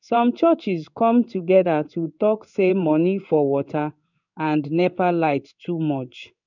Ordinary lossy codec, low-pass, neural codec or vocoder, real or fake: none; 7.2 kHz; codec, 16 kHz in and 24 kHz out, 1 kbps, XY-Tokenizer; fake